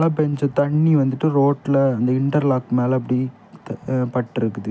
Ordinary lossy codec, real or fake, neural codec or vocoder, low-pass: none; real; none; none